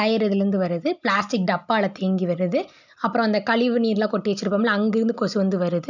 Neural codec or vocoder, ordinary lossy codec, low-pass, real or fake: none; none; 7.2 kHz; real